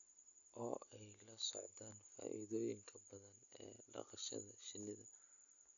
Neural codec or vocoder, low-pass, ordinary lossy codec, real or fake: none; 7.2 kHz; AAC, 48 kbps; real